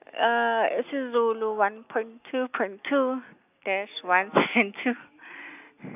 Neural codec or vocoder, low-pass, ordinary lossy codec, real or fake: none; 3.6 kHz; none; real